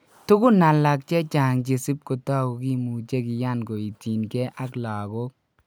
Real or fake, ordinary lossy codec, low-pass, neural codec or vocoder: real; none; none; none